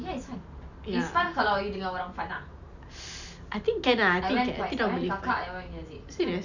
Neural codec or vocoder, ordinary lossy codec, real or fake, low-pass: none; none; real; 7.2 kHz